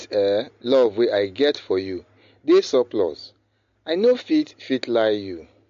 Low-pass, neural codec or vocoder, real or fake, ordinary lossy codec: 7.2 kHz; none; real; MP3, 48 kbps